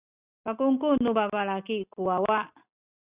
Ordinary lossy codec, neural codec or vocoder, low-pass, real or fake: Opus, 64 kbps; none; 3.6 kHz; real